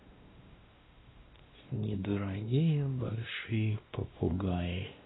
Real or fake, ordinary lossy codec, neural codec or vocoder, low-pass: fake; AAC, 16 kbps; codec, 16 kHz, 1 kbps, X-Codec, WavLM features, trained on Multilingual LibriSpeech; 7.2 kHz